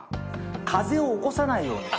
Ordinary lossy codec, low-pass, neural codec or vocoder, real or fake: none; none; none; real